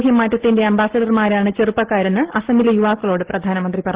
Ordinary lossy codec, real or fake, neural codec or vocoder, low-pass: Opus, 16 kbps; real; none; 3.6 kHz